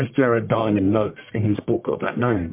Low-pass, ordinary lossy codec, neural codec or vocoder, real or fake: 3.6 kHz; MP3, 32 kbps; codec, 44.1 kHz, 1.7 kbps, Pupu-Codec; fake